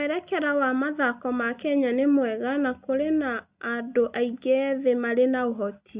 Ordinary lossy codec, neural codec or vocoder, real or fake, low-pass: Opus, 64 kbps; none; real; 3.6 kHz